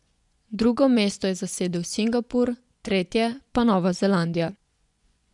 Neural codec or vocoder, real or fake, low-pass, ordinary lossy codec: vocoder, 24 kHz, 100 mel bands, Vocos; fake; 10.8 kHz; none